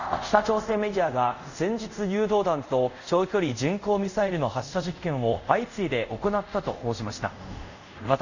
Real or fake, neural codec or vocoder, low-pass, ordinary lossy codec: fake; codec, 24 kHz, 0.5 kbps, DualCodec; 7.2 kHz; none